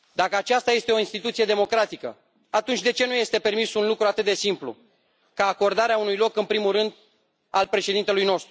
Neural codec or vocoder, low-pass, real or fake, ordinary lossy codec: none; none; real; none